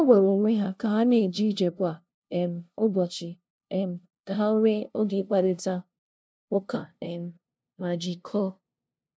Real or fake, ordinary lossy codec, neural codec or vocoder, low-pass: fake; none; codec, 16 kHz, 0.5 kbps, FunCodec, trained on LibriTTS, 25 frames a second; none